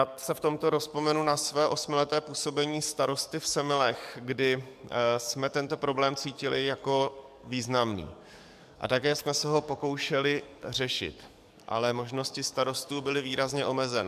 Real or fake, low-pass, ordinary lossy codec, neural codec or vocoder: fake; 14.4 kHz; MP3, 96 kbps; codec, 44.1 kHz, 7.8 kbps, DAC